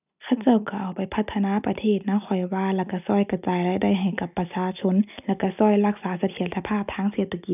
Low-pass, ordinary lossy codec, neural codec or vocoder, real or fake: 3.6 kHz; none; none; real